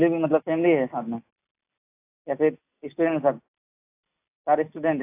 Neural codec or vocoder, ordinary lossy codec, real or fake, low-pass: none; AAC, 24 kbps; real; 3.6 kHz